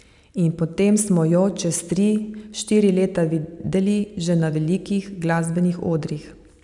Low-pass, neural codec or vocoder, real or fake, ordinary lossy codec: 10.8 kHz; none; real; none